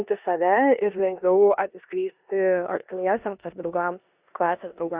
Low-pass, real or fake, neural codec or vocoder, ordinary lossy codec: 3.6 kHz; fake; codec, 16 kHz in and 24 kHz out, 0.9 kbps, LongCat-Audio-Codec, four codebook decoder; Opus, 64 kbps